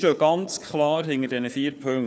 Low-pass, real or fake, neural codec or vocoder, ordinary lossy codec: none; fake; codec, 16 kHz, 4 kbps, FunCodec, trained on Chinese and English, 50 frames a second; none